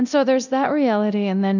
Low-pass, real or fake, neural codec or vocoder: 7.2 kHz; fake; codec, 24 kHz, 0.9 kbps, DualCodec